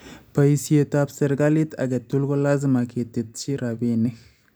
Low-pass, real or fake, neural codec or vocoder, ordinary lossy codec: none; real; none; none